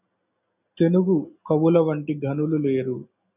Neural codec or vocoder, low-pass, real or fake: none; 3.6 kHz; real